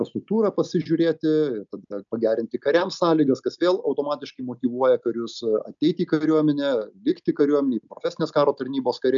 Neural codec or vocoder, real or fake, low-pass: none; real; 7.2 kHz